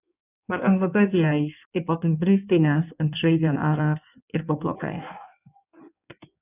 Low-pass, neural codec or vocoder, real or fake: 3.6 kHz; codec, 16 kHz in and 24 kHz out, 1.1 kbps, FireRedTTS-2 codec; fake